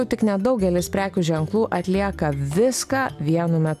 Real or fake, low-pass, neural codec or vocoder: real; 14.4 kHz; none